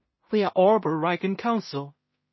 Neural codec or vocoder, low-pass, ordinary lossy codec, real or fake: codec, 16 kHz in and 24 kHz out, 0.4 kbps, LongCat-Audio-Codec, two codebook decoder; 7.2 kHz; MP3, 24 kbps; fake